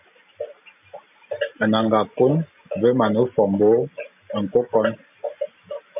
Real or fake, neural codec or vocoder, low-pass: real; none; 3.6 kHz